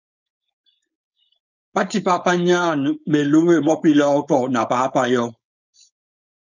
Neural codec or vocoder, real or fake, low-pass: codec, 16 kHz, 4.8 kbps, FACodec; fake; 7.2 kHz